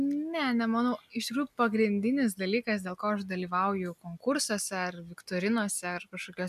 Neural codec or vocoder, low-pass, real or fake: none; 14.4 kHz; real